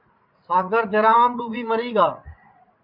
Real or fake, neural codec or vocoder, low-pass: fake; vocoder, 44.1 kHz, 80 mel bands, Vocos; 5.4 kHz